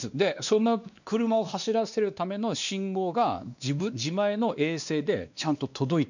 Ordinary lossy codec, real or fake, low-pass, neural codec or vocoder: none; fake; 7.2 kHz; codec, 16 kHz, 2 kbps, X-Codec, WavLM features, trained on Multilingual LibriSpeech